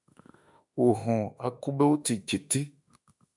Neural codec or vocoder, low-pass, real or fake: autoencoder, 48 kHz, 32 numbers a frame, DAC-VAE, trained on Japanese speech; 10.8 kHz; fake